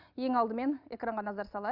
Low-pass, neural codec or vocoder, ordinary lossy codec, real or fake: 5.4 kHz; none; none; real